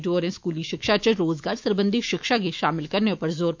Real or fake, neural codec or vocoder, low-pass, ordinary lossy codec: fake; autoencoder, 48 kHz, 128 numbers a frame, DAC-VAE, trained on Japanese speech; 7.2 kHz; MP3, 64 kbps